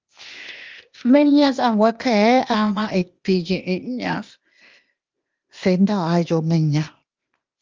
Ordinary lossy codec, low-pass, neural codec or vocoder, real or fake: Opus, 32 kbps; 7.2 kHz; codec, 16 kHz, 0.8 kbps, ZipCodec; fake